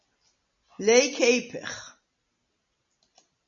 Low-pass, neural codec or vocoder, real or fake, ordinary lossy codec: 7.2 kHz; none; real; MP3, 32 kbps